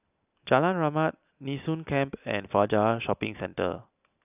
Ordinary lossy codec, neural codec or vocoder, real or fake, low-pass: none; none; real; 3.6 kHz